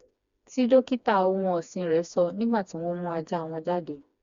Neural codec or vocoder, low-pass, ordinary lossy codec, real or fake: codec, 16 kHz, 2 kbps, FreqCodec, smaller model; 7.2 kHz; Opus, 64 kbps; fake